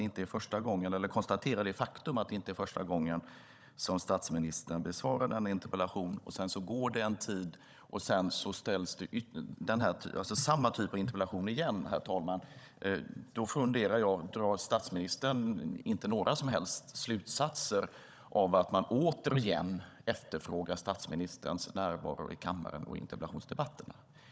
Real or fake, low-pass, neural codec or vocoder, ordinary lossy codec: fake; none; codec, 16 kHz, 16 kbps, FunCodec, trained on Chinese and English, 50 frames a second; none